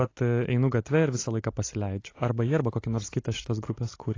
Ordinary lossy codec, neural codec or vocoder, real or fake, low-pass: AAC, 32 kbps; none; real; 7.2 kHz